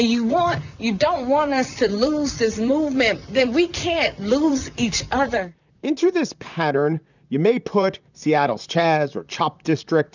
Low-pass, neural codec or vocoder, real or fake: 7.2 kHz; vocoder, 44.1 kHz, 128 mel bands, Pupu-Vocoder; fake